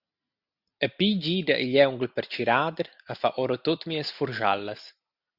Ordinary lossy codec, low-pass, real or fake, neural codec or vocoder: Opus, 64 kbps; 5.4 kHz; real; none